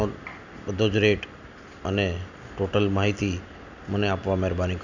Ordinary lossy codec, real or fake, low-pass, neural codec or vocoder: none; real; 7.2 kHz; none